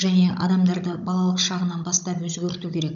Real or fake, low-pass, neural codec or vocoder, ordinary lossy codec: fake; 7.2 kHz; codec, 16 kHz, 16 kbps, FunCodec, trained on Chinese and English, 50 frames a second; none